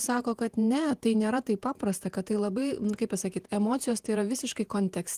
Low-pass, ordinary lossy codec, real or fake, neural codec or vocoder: 14.4 kHz; Opus, 24 kbps; fake; vocoder, 48 kHz, 128 mel bands, Vocos